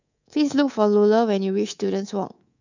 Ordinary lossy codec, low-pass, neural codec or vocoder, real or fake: none; 7.2 kHz; codec, 24 kHz, 3.1 kbps, DualCodec; fake